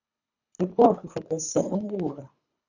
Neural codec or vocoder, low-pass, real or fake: codec, 24 kHz, 3 kbps, HILCodec; 7.2 kHz; fake